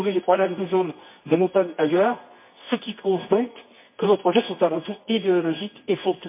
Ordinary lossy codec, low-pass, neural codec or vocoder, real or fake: MP3, 24 kbps; 3.6 kHz; codec, 24 kHz, 0.9 kbps, WavTokenizer, medium music audio release; fake